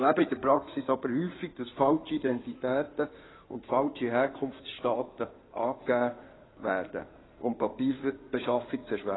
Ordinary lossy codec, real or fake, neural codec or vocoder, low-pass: AAC, 16 kbps; fake; codec, 16 kHz in and 24 kHz out, 2.2 kbps, FireRedTTS-2 codec; 7.2 kHz